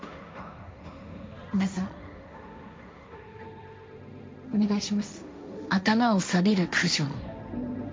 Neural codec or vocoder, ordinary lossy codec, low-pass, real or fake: codec, 16 kHz, 1.1 kbps, Voila-Tokenizer; none; none; fake